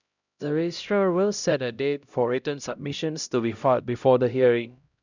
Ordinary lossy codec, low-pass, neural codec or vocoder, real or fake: none; 7.2 kHz; codec, 16 kHz, 0.5 kbps, X-Codec, HuBERT features, trained on LibriSpeech; fake